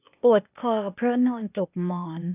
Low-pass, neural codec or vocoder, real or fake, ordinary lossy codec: 3.6 kHz; codec, 16 kHz, 0.8 kbps, ZipCodec; fake; none